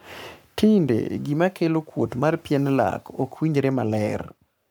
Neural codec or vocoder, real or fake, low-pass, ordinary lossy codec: codec, 44.1 kHz, 7.8 kbps, Pupu-Codec; fake; none; none